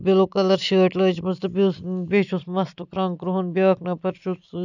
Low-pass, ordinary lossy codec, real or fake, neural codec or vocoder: 7.2 kHz; none; real; none